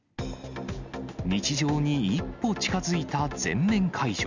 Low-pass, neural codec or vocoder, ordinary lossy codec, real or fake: 7.2 kHz; none; none; real